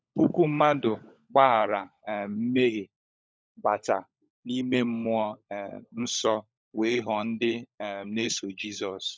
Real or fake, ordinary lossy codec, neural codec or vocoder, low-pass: fake; none; codec, 16 kHz, 16 kbps, FunCodec, trained on LibriTTS, 50 frames a second; none